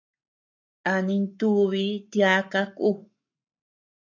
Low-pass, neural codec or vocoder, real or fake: 7.2 kHz; codec, 44.1 kHz, 7.8 kbps, Pupu-Codec; fake